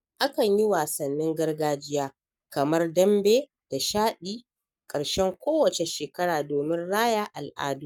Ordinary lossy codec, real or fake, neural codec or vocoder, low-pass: none; fake; codec, 44.1 kHz, 7.8 kbps, Pupu-Codec; 19.8 kHz